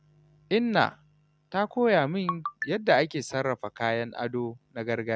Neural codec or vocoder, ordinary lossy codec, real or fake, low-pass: none; none; real; none